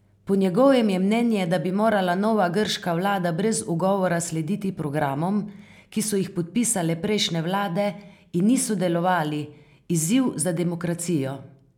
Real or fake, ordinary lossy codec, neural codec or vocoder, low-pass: real; none; none; 19.8 kHz